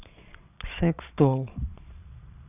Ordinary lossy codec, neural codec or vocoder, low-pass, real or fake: none; vocoder, 22.05 kHz, 80 mel bands, Vocos; 3.6 kHz; fake